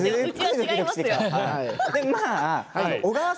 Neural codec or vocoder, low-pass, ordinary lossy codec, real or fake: none; none; none; real